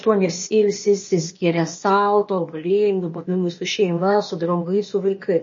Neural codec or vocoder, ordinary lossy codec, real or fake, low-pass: codec, 16 kHz, 0.8 kbps, ZipCodec; MP3, 32 kbps; fake; 7.2 kHz